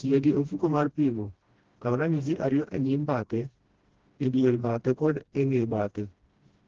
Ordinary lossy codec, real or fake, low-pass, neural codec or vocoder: Opus, 16 kbps; fake; 7.2 kHz; codec, 16 kHz, 1 kbps, FreqCodec, smaller model